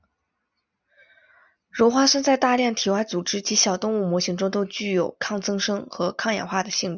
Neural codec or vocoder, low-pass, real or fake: none; 7.2 kHz; real